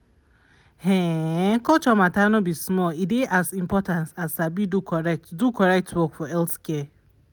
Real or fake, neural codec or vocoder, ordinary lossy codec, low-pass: real; none; none; none